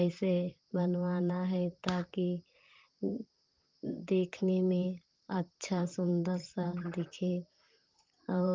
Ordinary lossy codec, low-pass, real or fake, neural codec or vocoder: Opus, 32 kbps; 7.2 kHz; fake; vocoder, 44.1 kHz, 128 mel bands, Pupu-Vocoder